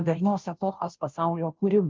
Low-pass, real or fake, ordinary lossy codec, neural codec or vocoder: 7.2 kHz; fake; Opus, 24 kbps; codec, 16 kHz, 0.5 kbps, FunCodec, trained on Chinese and English, 25 frames a second